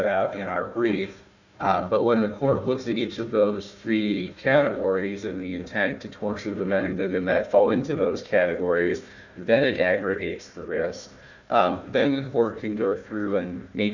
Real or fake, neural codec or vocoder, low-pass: fake; codec, 16 kHz, 1 kbps, FunCodec, trained on Chinese and English, 50 frames a second; 7.2 kHz